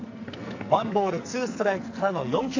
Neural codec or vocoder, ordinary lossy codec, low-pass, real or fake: codec, 44.1 kHz, 2.6 kbps, SNAC; AAC, 48 kbps; 7.2 kHz; fake